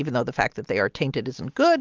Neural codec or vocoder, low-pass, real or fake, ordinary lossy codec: none; 7.2 kHz; real; Opus, 32 kbps